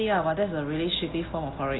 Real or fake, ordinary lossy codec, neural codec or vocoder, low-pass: real; AAC, 16 kbps; none; 7.2 kHz